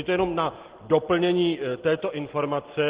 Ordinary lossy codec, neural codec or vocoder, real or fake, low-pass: Opus, 16 kbps; none; real; 3.6 kHz